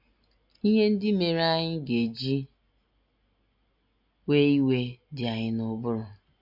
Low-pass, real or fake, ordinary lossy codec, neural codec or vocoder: 5.4 kHz; real; MP3, 48 kbps; none